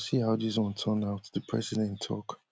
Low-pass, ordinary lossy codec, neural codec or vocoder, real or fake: none; none; none; real